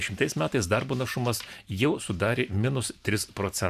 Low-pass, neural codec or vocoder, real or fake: 14.4 kHz; vocoder, 44.1 kHz, 128 mel bands every 512 samples, BigVGAN v2; fake